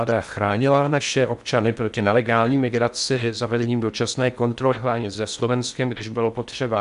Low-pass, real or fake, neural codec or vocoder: 10.8 kHz; fake; codec, 16 kHz in and 24 kHz out, 0.6 kbps, FocalCodec, streaming, 4096 codes